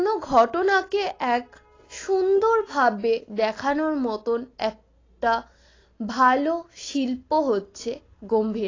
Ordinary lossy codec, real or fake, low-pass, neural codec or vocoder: AAC, 32 kbps; real; 7.2 kHz; none